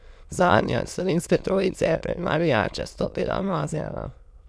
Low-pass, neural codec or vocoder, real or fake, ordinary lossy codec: none; autoencoder, 22.05 kHz, a latent of 192 numbers a frame, VITS, trained on many speakers; fake; none